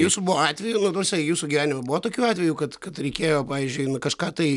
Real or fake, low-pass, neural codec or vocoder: real; 10.8 kHz; none